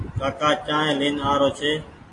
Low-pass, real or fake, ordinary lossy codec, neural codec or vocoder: 10.8 kHz; real; AAC, 32 kbps; none